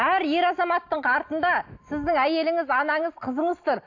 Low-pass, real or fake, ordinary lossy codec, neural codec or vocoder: 7.2 kHz; real; AAC, 48 kbps; none